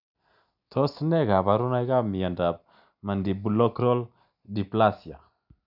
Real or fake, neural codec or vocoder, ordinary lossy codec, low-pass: real; none; none; 5.4 kHz